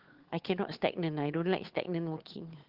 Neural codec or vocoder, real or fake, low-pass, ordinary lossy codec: codec, 16 kHz, 8 kbps, FunCodec, trained on Chinese and English, 25 frames a second; fake; 5.4 kHz; Opus, 16 kbps